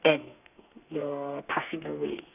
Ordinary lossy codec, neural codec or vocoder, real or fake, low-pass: none; codec, 32 kHz, 1.9 kbps, SNAC; fake; 3.6 kHz